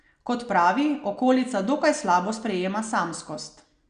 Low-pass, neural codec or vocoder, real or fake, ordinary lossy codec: 9.9 kHz; none; real; Opus, 64 kbps